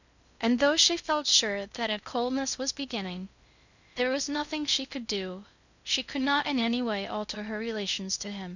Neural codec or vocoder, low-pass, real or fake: codec, 16 kHz in and 24 kHz out, 0.6 kbps, FocalCodec, streaming, 2048 codes; 7.2 kHz; fake